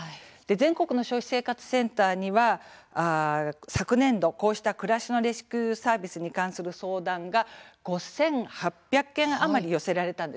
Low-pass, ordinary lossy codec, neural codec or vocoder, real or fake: none; none; none; real